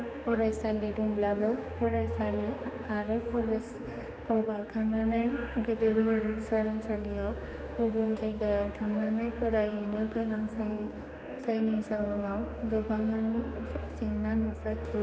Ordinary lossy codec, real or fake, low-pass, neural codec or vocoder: none; fake; none; codec, 16 kHz, 2 kbps, X-Codec, HuBERT features, trained on general audio